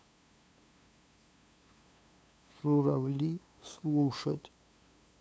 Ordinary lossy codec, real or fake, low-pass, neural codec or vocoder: none; fake; none; codec, 16 kHz, 2 kbps, FunCodec, trained on LibriTTS, 25 frames a second